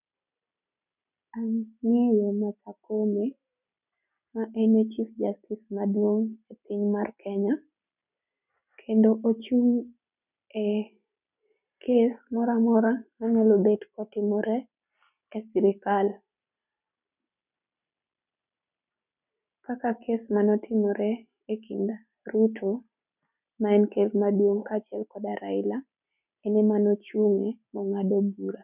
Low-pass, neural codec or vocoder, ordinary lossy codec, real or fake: 3.6 kHz; none; none; real